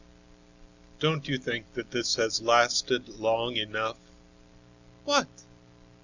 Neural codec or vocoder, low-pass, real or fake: none; 7.2 kHz; real